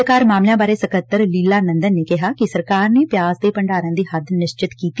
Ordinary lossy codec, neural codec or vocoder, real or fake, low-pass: none; none; real; none